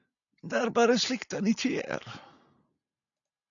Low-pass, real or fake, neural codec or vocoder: 7.2 kHz; real; none